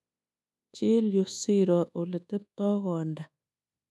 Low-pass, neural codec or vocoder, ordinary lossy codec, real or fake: none; codec, 24 kHz, 1.2 kbps, DualCodec; none; fake